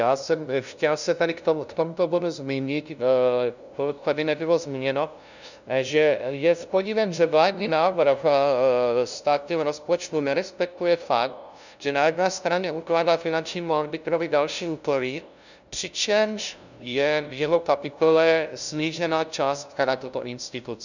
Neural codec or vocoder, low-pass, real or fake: codec, 16 kHz, 0.5 kbps, FunCodec, trained on LibriTTS, 25 frames a second; 7.2 kHz; fake